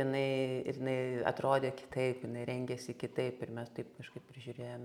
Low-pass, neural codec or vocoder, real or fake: 19.8 kHz; none; real